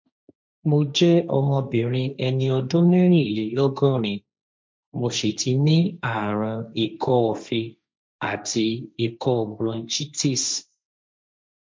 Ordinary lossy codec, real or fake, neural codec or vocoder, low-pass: none; fake; codec, 16 kHz, 1.1 kbps, Voila-Tokenizer; none